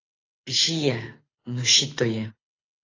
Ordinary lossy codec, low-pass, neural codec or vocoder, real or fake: AAC, 32 kbps; 7.2 kHz; codec, 24 kHz, 6 kbps, HILCodec; fake